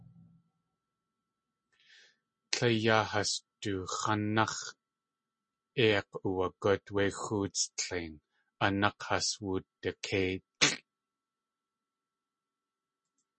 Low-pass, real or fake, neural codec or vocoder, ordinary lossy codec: 10.8 kHz; real; none; MP3, 32 kbps